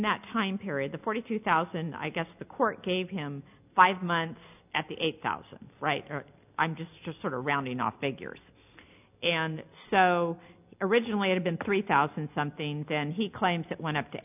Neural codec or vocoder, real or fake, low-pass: none; real; 3.6 kHz